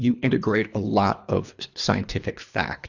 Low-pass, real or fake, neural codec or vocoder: 7.2 kHz; fake; codec, 24 kHz, 3 kbps, HILCodec